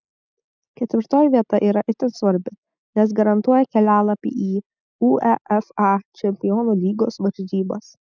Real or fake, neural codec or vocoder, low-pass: real; none; 7.2 kHz